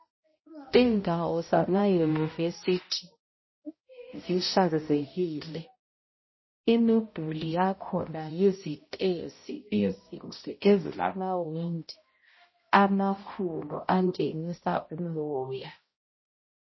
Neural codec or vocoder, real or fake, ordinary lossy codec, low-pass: codec, 16 kHz, 0.5 kbps, X-Codec, HuBERT features, trained on balanced general audio; fake; MP3, 24 kbps; 7.2 kHz